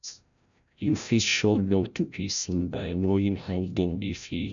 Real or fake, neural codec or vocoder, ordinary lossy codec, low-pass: fake; codec, 16 kHz, 0.5 kbps, FreqCodec, larger model; none; 7.2 kHz